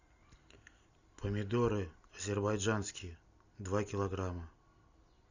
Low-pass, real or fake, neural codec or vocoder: 7.2 kHz; real; none